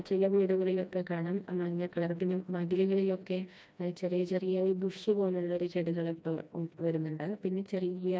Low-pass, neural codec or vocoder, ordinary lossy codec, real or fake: none; codec, 16 kHz, 1 kbps, FreqCodec, smaller model; none; fake